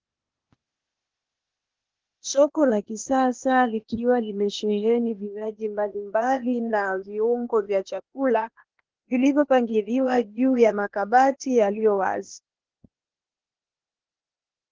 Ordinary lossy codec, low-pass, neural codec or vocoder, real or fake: Opus, 16 kbps; 7.2 kHz; codec, 16 kHz, 0.8 kbps, ZipCodec; fake